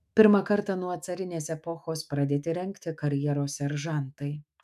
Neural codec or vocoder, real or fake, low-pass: autoencoder, 48 kHz, 128 numbers a frame, DAC-VAE, trained on Japanese speech; fake; 14.4 kHz